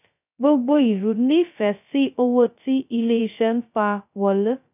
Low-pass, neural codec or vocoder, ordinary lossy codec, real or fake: 3.6 kHz; codec, 16 kHz, 0.2 kbps, FocalCodec; none; fake